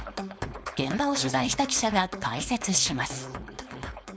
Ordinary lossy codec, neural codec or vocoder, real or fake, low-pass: none; codec, 16 kHz, 4.8 kbps, FACodec; fake; none